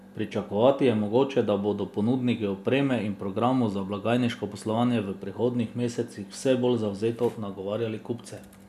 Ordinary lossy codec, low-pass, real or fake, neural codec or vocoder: none; 14.4 kHz; real; none